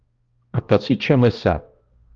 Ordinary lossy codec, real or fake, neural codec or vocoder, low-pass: Opus, 32 kbps; fake; codec, 16 kHz, 1 kbps, X-Codec, HuBERT features, trained on balanced general audio; 7.2 kHz